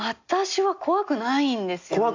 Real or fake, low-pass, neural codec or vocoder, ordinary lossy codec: real; 7.2 kHz; none; AAC, 48 kbps